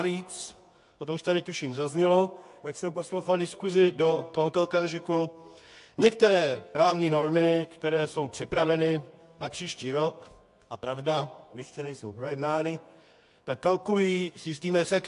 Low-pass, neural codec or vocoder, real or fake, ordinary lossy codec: 10.8 kHz; codec, 24 kHz, 0.9 kbps, WavTokenizer, medium music audio release; fake; AAC, 64 kbps